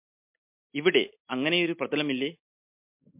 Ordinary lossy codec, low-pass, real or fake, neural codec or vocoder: MP3, 32 kbps; 3.6 kHz; real; none